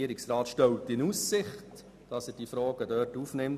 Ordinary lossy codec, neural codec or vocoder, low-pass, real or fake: none; none; 14.4 kHz; real